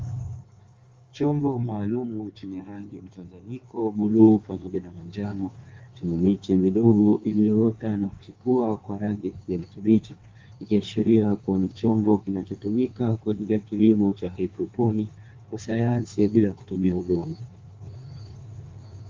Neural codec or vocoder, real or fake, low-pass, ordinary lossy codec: codec, 16 kHz in and 24 kHz out, 1.1 kbps, FireRedTTS-2 codec; fake; 7.2 kHz; Opus, 32 kbps